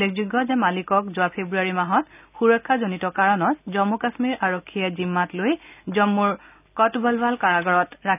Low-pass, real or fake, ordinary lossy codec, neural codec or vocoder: 3.6 kHz; real; none; none